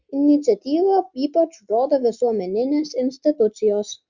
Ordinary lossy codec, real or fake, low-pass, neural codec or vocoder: Opus, 64 kbps; real; 7.2 kHz; none